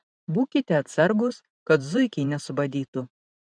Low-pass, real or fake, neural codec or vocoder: 9.9 kHz; fake; vocoder, 44.1 kHz, 128 mel bands every 512 samples, BigVGAN v2